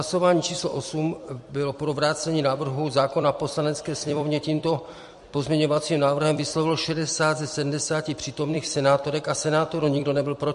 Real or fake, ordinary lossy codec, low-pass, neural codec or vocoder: fake; MP3, 48 kbps; 14.4 kHz; vocoder, 44.1 kHz, 128 mel bands every 256 samples, BigVGAN v2